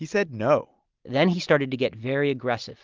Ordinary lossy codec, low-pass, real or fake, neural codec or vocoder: Opus, 32 kbps; 7.2 kHz; real; none